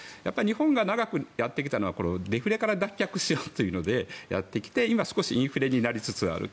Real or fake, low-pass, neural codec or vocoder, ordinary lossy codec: real; none; none; none